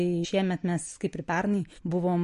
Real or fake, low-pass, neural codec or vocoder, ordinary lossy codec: real; 10.8 kHz; none; MP3, 48 kbps